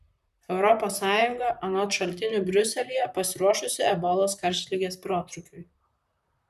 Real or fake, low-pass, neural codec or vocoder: fake; 14.4 kHz; vocoder, 44.1 kHz, 128 mel bands, Pupu-Vocoder